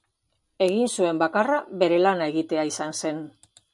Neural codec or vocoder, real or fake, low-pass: none; real; 10.8 kHz